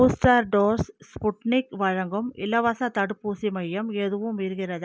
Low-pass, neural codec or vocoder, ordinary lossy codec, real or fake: none; none; none; real